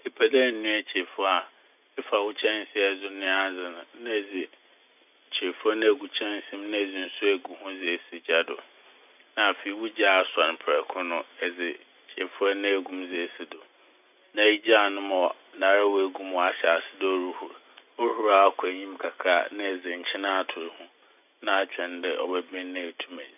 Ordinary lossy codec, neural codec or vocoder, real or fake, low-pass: none; none; real; 3.6 kHz